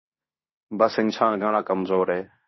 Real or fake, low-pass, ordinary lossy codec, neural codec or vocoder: fake; 7.2 kHz; MP3, 24 kbps; codec, 16 kHz in and 24 kHz out, 0.9 kbps, LongCat-Audio-Codec, fine tuned four codebook decoder